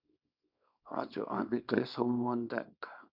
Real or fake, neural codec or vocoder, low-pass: fake; codec, 24 kHz, 0.9 kbps, WavTokenizer, small release; 5.4 kHz